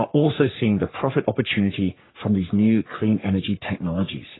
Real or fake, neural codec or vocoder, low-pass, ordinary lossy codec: fake; codec, 44.1 kHz, 3.4 kbps, Pupu-Codec; 7.2 kHz; AAC, 16 kbps